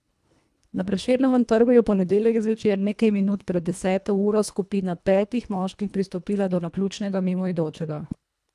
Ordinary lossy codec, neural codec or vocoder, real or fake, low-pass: none; codec, 24 kHz, 1.5 kbps, HILCodec; fake; none